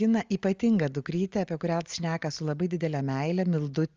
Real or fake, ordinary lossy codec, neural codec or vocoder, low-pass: real; Opus, 32 kbps; none; 7.2 kHz